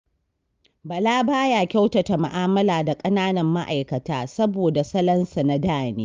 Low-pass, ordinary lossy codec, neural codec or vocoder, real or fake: 7.2 kHz; Opus, 32 kbps; none; real